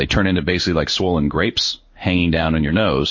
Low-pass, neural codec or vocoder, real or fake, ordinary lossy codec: 7.2 kHz; codec, 16 kHz in and 24 kHz out, 1 kbps, XY-Tokenizer; fake; MP3, 32 kbps